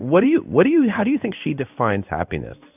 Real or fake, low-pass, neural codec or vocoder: fake; 3.6 kHz; codec, 16 kHz in and 24 kHz out, 1 kbps, XY-Tokenizer